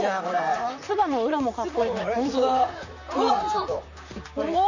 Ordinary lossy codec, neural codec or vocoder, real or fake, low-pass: none; vocoder, 44.1 kHz, 128 mel bands, Pupu-Vocoder; fake; 7.2 kHz